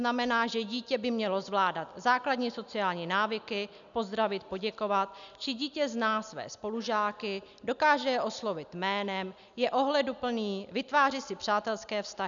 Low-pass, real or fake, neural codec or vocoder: 7.2 kHz; real; none